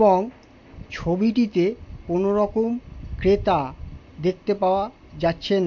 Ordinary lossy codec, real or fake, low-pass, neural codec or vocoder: MP3, 48 kbps; real; 7.2 kHz; none